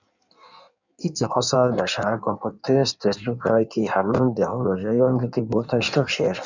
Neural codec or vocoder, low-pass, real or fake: codec, 16 kHz in and 24 kHz out, 1.1 kbps, FireRedTTS-2 codec; 7.2 kHz; fake